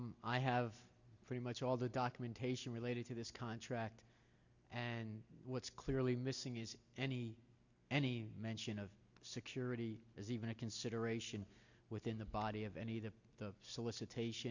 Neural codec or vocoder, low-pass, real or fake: none; 7.2 kHz; real